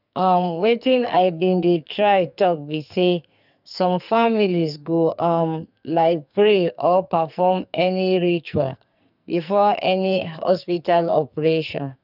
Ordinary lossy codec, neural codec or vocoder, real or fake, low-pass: none; codec, 44.1 kHz, 2.6 kbps, SNAC; fake; 5.4 kHz